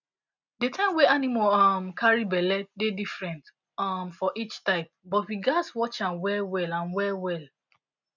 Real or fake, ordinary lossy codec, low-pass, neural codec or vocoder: real; none; 7.2 kHz; none